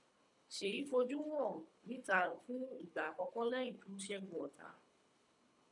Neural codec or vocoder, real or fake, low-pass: codec, 24 kHz, 3 kbps, HILCodec; fake; 10.8 kHz